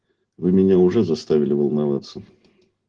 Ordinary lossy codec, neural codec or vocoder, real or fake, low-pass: Opus, 16 kbps; none; real; 9.9 kHz